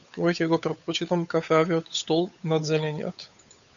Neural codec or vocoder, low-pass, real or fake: codec, 16 kHz, 8 kbps, FunCodec, trained on Chinese and English, 25 frames a second; 7.2 kHz; fake